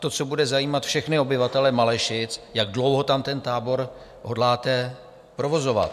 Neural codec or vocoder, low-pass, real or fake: none; 14.4 kHz; real